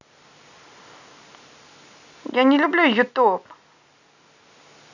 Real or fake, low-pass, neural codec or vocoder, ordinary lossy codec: real; 7.2 kHz; none; none